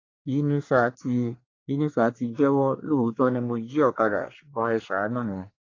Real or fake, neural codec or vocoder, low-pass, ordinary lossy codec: fake; codec, 24 kHz, 1 kbps, SNAC; 7.2 kHz; AAC, 32 kbps